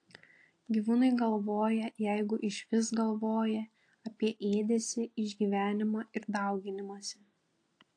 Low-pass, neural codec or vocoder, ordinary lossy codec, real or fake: 9.9 kHz; none; AAC, 48 kbps; real